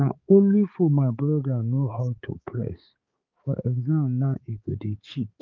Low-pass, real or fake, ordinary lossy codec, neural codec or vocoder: 7.2 kHz; fake; Opus, 24 kbps; codec, 16 kHz, 4 kbps, X-Codec, HuBERT features, trained on balanced general audio